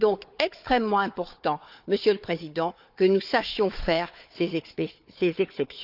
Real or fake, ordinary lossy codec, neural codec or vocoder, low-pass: fake; none; codec, 16 kHz, 4 kbps, FunCodec, trained on Chinese and English, 50 frames a second; 5.4 kHz